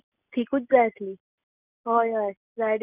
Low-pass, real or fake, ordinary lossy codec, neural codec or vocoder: 3.6 kHz; real; none; none